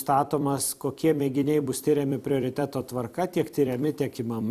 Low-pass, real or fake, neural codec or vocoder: 14.4 kHz; fake; vocoder, 44.1 kHz, 128 mel bands every 256 samples, BigVGAN v2